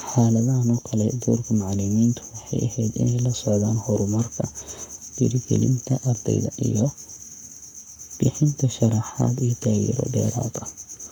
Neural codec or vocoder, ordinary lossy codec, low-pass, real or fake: codec, 44.1 kHz, 7.8 kbps, Pupu-Codec; none; 19.8 kHz; fake